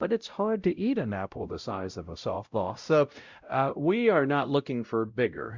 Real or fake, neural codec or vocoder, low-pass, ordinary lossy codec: fake; codec, 16 kHz, 0.5 kbps, X-Codec, WavLM features, trained on Multilingual LibriSpeech; 7.2 kHz; Opus, 64 kbps